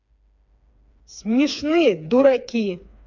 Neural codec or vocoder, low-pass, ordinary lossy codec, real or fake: codec, 16 kHz, 4 kbps, FreqCodec, smaller model; 7.2 kHz; none; fake